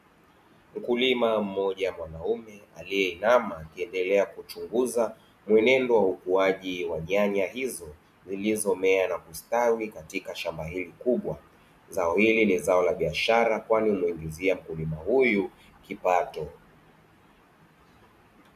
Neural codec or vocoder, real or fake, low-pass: none; real; 14.4 kHz